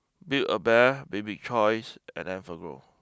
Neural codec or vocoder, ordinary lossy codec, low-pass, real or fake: none; none; none; real